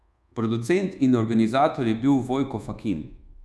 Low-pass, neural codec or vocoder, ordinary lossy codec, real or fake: none; codec, 24 kHz, 1.2 kbps, DualCodec; none; fake